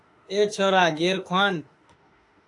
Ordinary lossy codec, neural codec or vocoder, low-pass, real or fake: AAC, 48 kbps; autoencoder, 48 kHz, 32 numbers a frame, DAC-VAE, trained on Japanese speech; 10.8 kHz; fake